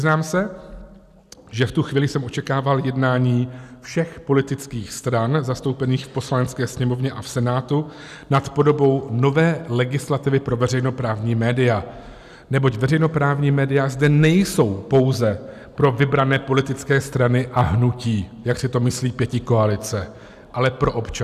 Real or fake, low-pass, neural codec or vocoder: real; 14.4 kHz; none